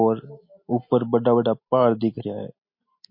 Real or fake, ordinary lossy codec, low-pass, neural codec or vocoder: real; MP3, 32 kbps; 5.4 kHz; none